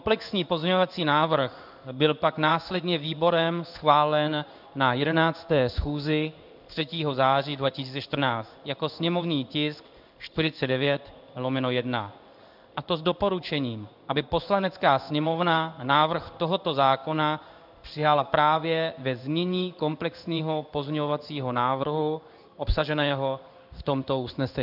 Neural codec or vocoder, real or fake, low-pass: codec, 16 kHz in and 24 kHz out, 1 kbps, XY-Tokenizer; fake; 5.4 kHz